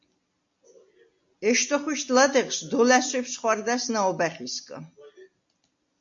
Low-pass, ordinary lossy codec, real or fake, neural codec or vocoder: 7.2 kHz; AAC, 64 kbps; real; none